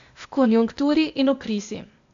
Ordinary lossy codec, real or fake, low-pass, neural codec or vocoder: AAC, 64 kbps; fake; 7.2 kHz; codec, 16 kHz, 0.8 kbps, ZipCodec